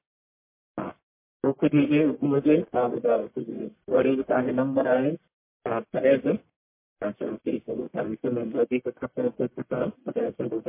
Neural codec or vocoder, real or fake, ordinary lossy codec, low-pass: codec, 44.1 kHz, 1.7 kbps, Pupu-Codec; fake; MP3, 24 kbps; 3.6 kHz